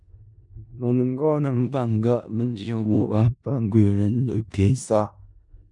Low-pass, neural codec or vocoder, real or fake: 10.8 kHz; codec, 16 kHz in and 24 kHz out, 0.4 kbps, LongCat-Audio-Codec, four codebook decoder; fake